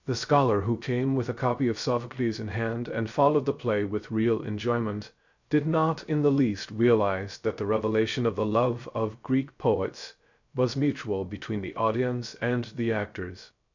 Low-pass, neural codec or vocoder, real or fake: 7.2 kHz; codec, 16 kHz, 0.3 kbps, FocalCodec; fake